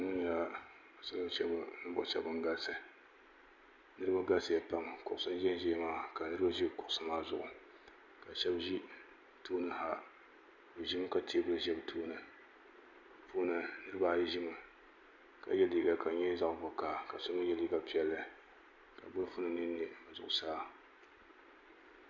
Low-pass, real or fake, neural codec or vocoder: 7.2 kHz; real; none